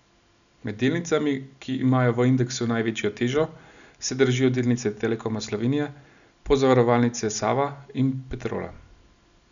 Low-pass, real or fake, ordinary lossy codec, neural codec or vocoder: 7.2 kHz; real; none; none